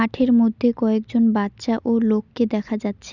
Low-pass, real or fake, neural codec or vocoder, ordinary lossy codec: 7.2 kHz; real; none; none